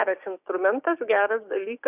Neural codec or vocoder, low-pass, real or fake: codec, 16 kHz, 6 kbps, DAC; 3.6 kHz; fake